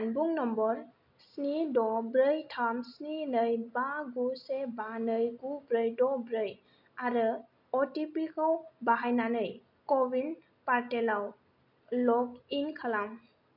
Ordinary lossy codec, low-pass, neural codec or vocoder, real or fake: none; 5.4 kHz; none; real